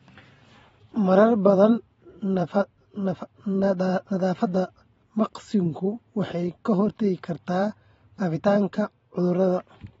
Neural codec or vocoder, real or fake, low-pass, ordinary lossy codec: vocoder, 44.1 kHz, 128 mel bands every 512 samples, BigVGAN v2; fake; 19.8 kHz; AAC, 24 kbps